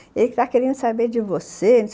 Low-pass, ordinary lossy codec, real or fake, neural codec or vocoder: none; none; real; none